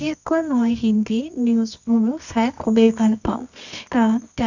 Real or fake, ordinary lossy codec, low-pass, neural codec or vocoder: fake; none; 7.2 kHz; codec, 24 kHz, 0.9 kbps, WavTokenizer, medium music audio release